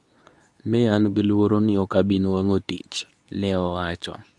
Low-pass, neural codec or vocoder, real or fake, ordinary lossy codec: none; codec, 24 kHz, 0.9 kbps, WavTokenizer, medium speech release version 2; fake; none